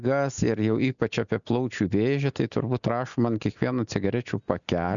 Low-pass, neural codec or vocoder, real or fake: 7.2 kHz; none; real